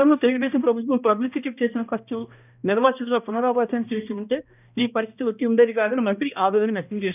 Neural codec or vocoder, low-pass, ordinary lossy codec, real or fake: codec, 16 kHz, 1 kbps, X-Codec, HuBERT features, trained on balanced general audio; 3.6 kHz; none; fake